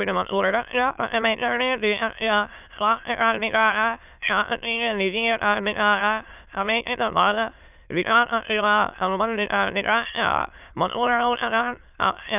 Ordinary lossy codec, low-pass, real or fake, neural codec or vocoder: none; 3.6 kHz; fake; autoencoder, 22.05 kHz, a latent of 192 numbers a frame, VITS, trained on many speakers